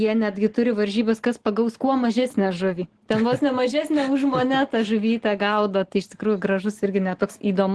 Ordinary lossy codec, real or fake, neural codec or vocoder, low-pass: Opus, 16 kbps; real; none; 10.8 kHz